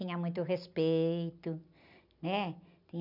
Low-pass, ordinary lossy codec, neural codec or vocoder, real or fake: 5.4 kHz; none; none; real